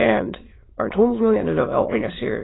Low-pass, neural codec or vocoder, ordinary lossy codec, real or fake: 7.2 kHz; autoencoder, 22.05 kHz, a latent of 192 numbers a frame, VITS, trained on many speakers; AAC, 16 kbps; fake